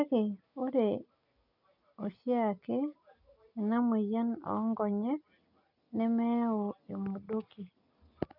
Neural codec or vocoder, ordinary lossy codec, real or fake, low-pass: none; none; real; 5.4 kHz